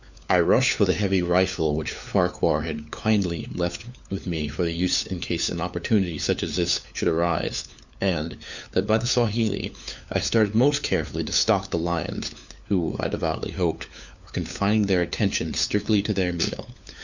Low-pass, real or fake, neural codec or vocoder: 7.2 kHz; fake; codec, 16 kHz, 4 kbps, FunCodec, trained on LibriTTS, 50 frames a second